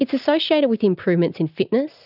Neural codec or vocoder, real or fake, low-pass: none; real; 5.4 kHz